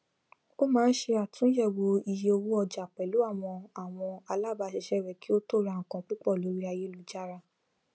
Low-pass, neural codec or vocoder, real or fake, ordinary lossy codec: none; none; real; none